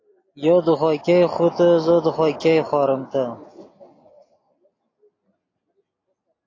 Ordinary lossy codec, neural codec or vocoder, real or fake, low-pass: AAC, 32 kbps; none; real; 7.2 kHz